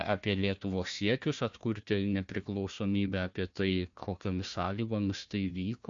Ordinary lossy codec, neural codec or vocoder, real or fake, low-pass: MP3, 48 kbps; codec, 16 kHz, 1 kbps, FunCodec, trained on Chinese and English, 50 frames a second; fake; 7.2 kHz